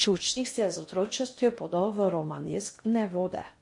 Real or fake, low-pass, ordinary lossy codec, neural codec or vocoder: fake; 10.8 kHz; MP3, 48 kbps; codec, 16 kHz in and 24 kHz out, 0.6 kbps, FocalCodec, streaming, 4096 codes